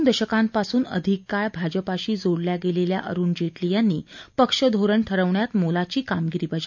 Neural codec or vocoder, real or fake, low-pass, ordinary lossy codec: none; real; 7.2 kHz; none